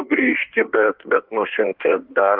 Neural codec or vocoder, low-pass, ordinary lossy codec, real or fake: codec, 16 kHz in and 24 kHz out, 1.1 kbps, FireRedTTS-2 codec; 5.4 kHz; Opus, 24 kbps; fake